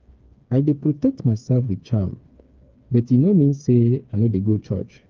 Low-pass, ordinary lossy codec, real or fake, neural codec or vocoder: 7.2 kHz; Opus, 24 kbps; fake; codec, 16 kHz, 4 kbps, FreqCodec, smaller model